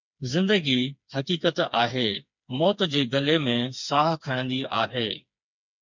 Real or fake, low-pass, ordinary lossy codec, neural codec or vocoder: fake; 7.2 kHz; MP3, 64 kbps; codec, 16 kHz, 4 kbps, FreqCodec, smaller model